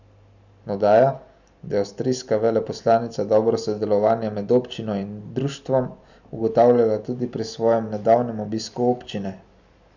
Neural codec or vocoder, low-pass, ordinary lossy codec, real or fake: none; 7.2 kHz; none; real